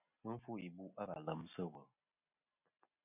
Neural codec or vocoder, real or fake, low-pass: none; real; 3.6 kHz